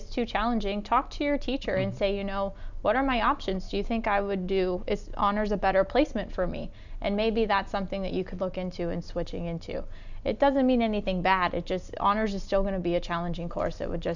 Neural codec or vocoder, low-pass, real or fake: none; 7.2 kHz; real